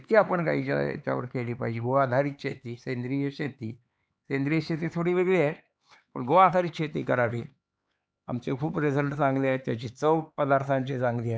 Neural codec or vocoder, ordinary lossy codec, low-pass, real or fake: codec, 16 kHz, 4 kbps, X-Codec, HuBERT features, trained on LibriSpeech; none; none; fake